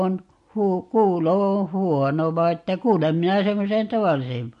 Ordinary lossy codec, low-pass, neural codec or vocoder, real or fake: MP3, 48 kbps; 10.8 kHz; none; real